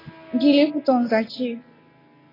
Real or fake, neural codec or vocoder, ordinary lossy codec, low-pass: real; none; AAC, 24 kbps; 5.4 kHz